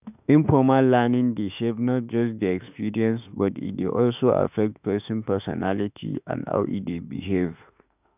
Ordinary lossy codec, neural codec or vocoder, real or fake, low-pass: none; autoencoder, 48 kHz, 32 numbers a frame, DAC-VAE, trained on Japanese speech; fake; 3.6 kHz